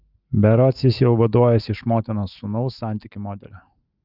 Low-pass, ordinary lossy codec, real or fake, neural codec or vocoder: 5.4 kHz; Opus, 24 kbps; fake; autoencoder, 48 kHz, 128 numbers a frame, DAC-VAE, trained on Japanese speech